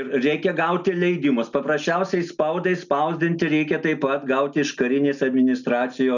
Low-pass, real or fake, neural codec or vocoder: 7.2 kHz; real; none